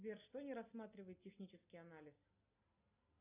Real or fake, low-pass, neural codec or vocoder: real; 3.6 kHz; none